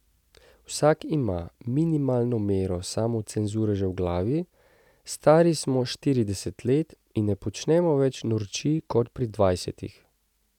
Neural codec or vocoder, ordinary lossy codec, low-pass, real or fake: none; none; 19.8 kHz; real